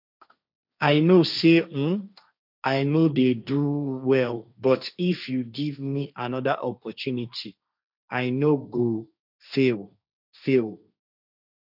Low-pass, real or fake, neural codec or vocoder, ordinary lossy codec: 5.4 kHz; fake; codec, 16 kHz, 1.1 kbps, Voila-Tokenizer; none